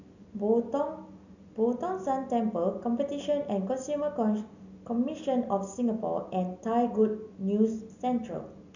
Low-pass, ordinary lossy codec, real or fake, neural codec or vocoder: 7.2 kHz; none; real; none